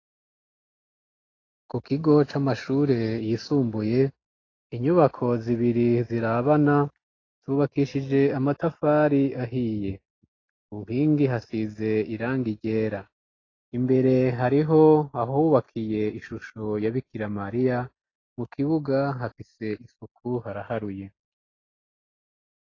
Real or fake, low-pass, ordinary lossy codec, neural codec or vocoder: real; 7.2 kHz; AAC, 32 kbps; none